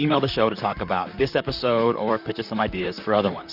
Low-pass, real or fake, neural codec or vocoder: 5.4 kHz; fake; vocoder, 44.1 kHz, 128 mel bands, Pupu-Vocoder